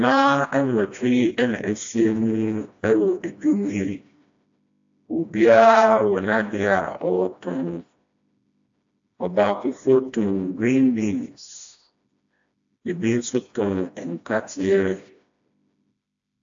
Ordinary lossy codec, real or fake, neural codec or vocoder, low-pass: AAC, 64 kbps; fake; codec, 16 kHz, 1 kbps, FreqCodec, smaller model; 7.2 kHz